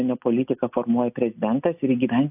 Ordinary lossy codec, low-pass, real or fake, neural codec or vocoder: AAC, 32 kbps; 3.6 kHz; real; none